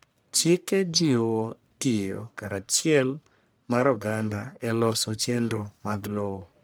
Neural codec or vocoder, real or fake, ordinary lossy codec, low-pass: codec, 44.1 kHz, 1.7 kbps, Pupu-Codec; fake; none; none